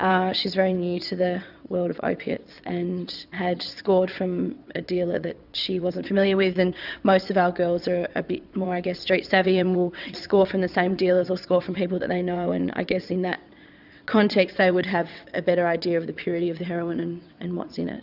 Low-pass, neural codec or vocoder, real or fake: 5.4 kHz; vocoder, 22.05 kHz, 80 mel bands, WaveNeXt; fake